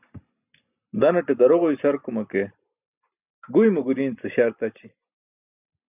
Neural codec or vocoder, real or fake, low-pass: none; real; 3.6 kHz